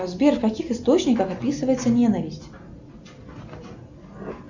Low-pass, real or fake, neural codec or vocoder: 7.2 kHz; real; none